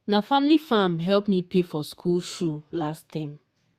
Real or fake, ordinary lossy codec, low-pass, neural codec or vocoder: fake; Opus, 64 kbps; 14.4 kHz; codec, 32 kHz, 1.9 kbps, SNAC